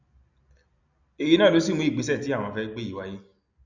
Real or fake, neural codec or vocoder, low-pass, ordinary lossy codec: real; none; 7.2 kHz; none